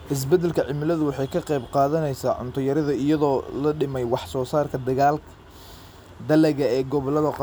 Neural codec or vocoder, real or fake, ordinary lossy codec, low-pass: none; real; none; none